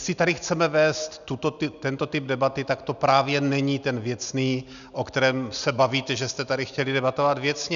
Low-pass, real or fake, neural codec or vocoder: 7.2 kHz; real; none